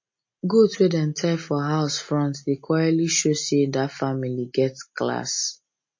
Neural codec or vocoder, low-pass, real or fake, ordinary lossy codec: none; 7.2 kHz; real; MP3, 32 kbps